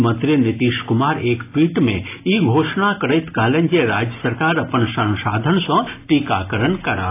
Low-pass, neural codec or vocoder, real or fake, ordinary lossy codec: 3.6 kHz; none; real; AAC, 24 kbps